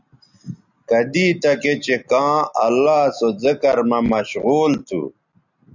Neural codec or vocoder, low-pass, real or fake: none; 7.2 kHz; real